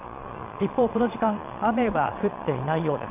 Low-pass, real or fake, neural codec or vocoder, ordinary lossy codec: 3.6 kHz; fake; vocoder, 22.05 kHz, 80 mel bands, Vocos; AAC, 32 kbps